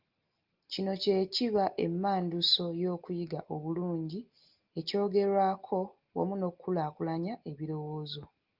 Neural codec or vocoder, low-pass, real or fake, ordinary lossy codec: none; 5.4 kHz; real; Opus, 32 kbps